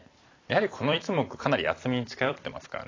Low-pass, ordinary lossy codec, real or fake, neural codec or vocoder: 7.2 kHz; AAC, 32 kbps; fake; vocoder, 44.1 kHz, 128 mel bands every 256 samples, BigVGAN v2